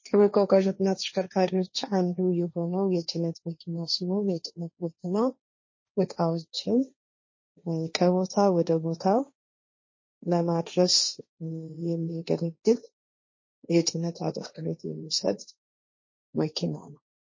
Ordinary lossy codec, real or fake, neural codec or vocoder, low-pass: MP3, 32 kbps; fake; codec, 16 kHz, 1.1 kbps, Voila-Tokenizer; 7.2 kHz